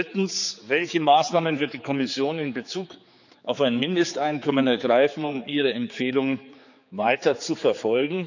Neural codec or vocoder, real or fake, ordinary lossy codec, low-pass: codec, 16 kHz, 4 kbps, X-Codec, HuBERT features, trained on general audio; fake; none; 7.2 kHz